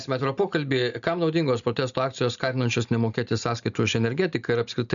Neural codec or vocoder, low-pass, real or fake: none; 7.2 kHz; real